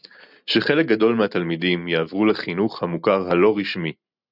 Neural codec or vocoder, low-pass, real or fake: none; 5.4 kHz; real